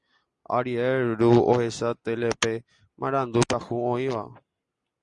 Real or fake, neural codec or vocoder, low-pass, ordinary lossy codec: real; none; 10.8 kHz; Opus, 64 kbps